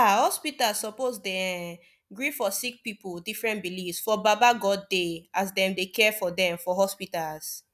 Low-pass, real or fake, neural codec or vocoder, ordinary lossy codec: 14.4 kHz; real; none; none